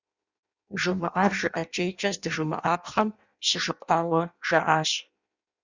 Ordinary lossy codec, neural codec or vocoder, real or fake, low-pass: Opus, 64 kbps; codec, 16 kHz in and 24 kHz out, 0.6 kbps, FireRedTTS-2 codec; fake; 7.2 kHz